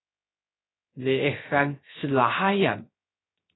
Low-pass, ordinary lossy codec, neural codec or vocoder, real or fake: 7.2 kHz; AAC, 16 kbps; codec, 16 kHz, 0.3 kbps, FocalCodec; fake